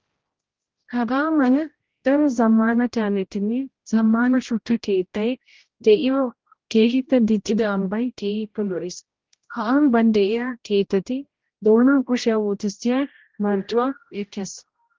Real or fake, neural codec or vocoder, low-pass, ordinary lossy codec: fake; codec, 16 kHz, 0.5 kbps, X-Codec, HuBERT features, trained on general audio; 7.2 kHz; Opus, 16 kbps